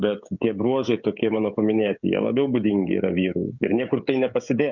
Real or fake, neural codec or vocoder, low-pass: fake; codec, 16 kHz, 16 kbps, FreqCodec, smaller model; 7.2 kHz